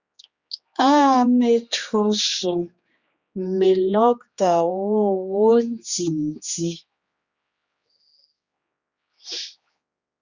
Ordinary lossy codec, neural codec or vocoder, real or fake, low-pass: Opus, 64 kbps; codec, 16 kHz, 2 kbps, X-Codec, HuBERT features, trained on general audio; fake; 7.2 kHz